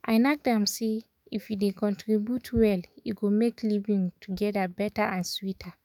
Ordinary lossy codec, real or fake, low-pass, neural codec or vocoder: none; fake; 19.8 kHz; autoencoder, 48 kHz, 128 numbers a frame, DAC-VAE, trained on Japanese speech